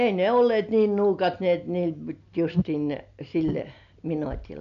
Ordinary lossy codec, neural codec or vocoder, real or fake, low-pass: none; none; real; 7.2 kHz